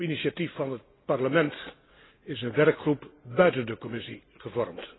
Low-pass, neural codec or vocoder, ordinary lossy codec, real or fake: 7.2 kHz; none; AAC, 16 kbps; real